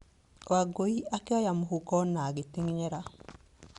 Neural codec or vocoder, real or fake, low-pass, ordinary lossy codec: none; real; 10.8 kHz; Opus, 64 kbps